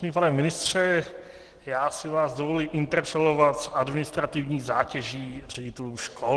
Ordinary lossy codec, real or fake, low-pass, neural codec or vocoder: Opus, 16 kbps; real; 10.8 kHz; none